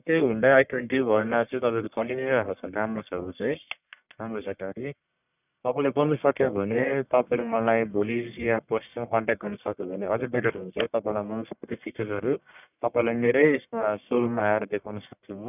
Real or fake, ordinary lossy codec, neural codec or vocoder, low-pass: fake; none; codec, 44.1 kHz, 1.7 kbps, Pupu-Codec; 3.6 kHz